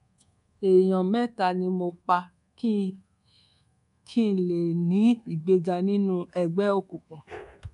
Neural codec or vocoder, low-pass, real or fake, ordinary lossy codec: codec, 24 kHz, 1.2 kbps, DualCodec; 10.8 kHz; fake; none